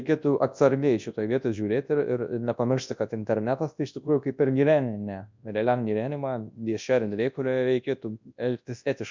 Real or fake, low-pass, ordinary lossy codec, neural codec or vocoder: fake; 7.2 kHz; MP3, 64 kbps; codec, 24 kHz, 0.9 kbps, WavTokenizer, large speech release